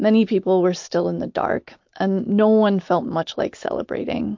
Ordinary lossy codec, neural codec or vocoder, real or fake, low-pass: MP3, 48 kbps; none; real; 7.2 kHz